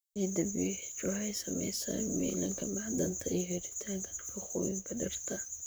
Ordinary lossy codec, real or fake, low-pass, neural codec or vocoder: none; fake; none; vocoder, 44.1 kHz, 128 mel bands, Pupu-Vocoder